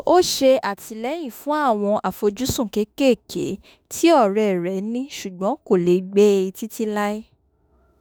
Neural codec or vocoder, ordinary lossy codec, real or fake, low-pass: autoencoder, 48 kHz, 32 numbers a frame, DAC-VAE, trained on Japanese speech; none; fake; none